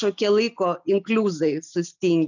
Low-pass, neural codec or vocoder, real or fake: 7.2 kHz; none; real